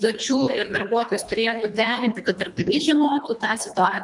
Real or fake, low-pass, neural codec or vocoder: fake; 10.8 kHz; codec, 24 kHz, 1.5 kbps, HILCodec